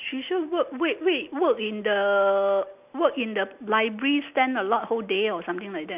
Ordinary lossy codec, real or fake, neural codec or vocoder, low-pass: none; real; none; 3.6 kHz